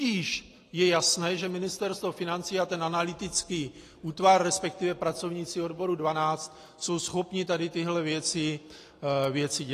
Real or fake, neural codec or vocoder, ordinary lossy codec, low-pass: real; none; AAC, 48 kbps; 14.4 kHz